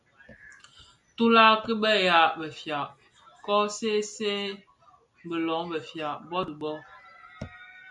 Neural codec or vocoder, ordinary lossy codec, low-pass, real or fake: none; MP3, 96 kbps; 10.8 kHz; real